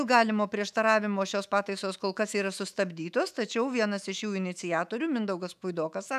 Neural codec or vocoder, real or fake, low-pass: autoencoder, 48 kHz, 128 numbers a frame, DAC-VAE, trained on Japanese speech; fake; 14.4 kHz